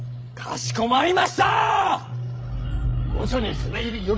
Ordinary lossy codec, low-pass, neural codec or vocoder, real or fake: none; none; codec, 16 kHz, 16 kbps, FreqCodec, larger model; fake